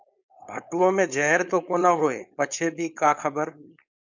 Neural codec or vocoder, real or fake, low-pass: codec, 16 kHz, 4.8 kbps, FACodec; fake; 7.2 kHz